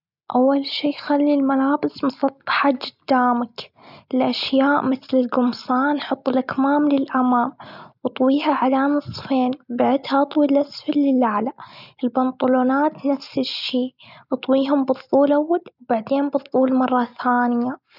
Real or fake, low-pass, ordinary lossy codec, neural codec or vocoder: real; 5.4 kHz; none; none